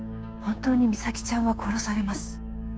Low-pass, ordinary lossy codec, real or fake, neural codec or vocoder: none; none; fake; codec, 16 kHz, 6 kbps, DAC